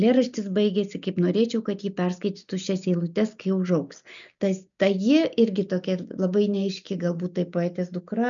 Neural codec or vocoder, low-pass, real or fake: none; 7.2 kHz; real